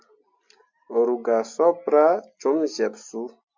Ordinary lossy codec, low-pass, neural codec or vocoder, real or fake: MP3, 48 kbps; 7.2 kHz; none; real